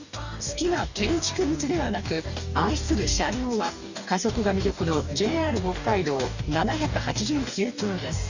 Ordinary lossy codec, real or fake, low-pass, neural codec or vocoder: none; fake; 7.2 kHz; codec, 44.1 kHz, 2.6 kbps, DAC